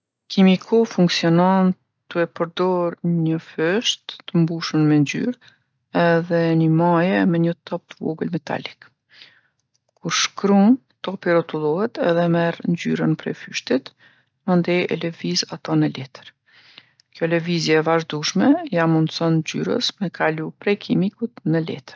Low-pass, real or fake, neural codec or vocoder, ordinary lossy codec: none; real; none; none